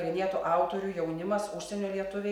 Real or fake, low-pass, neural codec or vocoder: real; 19.8 kHz; none